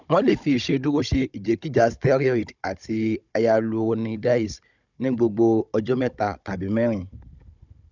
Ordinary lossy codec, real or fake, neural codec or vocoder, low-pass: none; fake; codec, 16 kHz, 16 kbps, FunCodec, trained on Chinese and English, 50 frames a second; 7.2 kHz